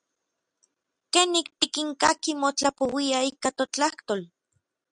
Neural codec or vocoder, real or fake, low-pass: none; real; 9.9 kHz